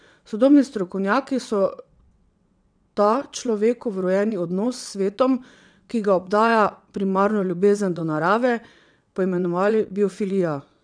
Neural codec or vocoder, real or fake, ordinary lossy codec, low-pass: vocoder, 22.05 kHz, 80 mel bands, WaveNeXt; fake; none; 9.9 kHz